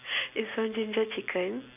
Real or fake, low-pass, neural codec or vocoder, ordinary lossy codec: real; 3.6 kHz; none; none